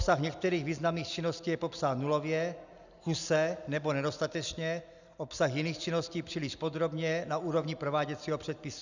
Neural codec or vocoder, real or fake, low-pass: none; real; 7.2 kHz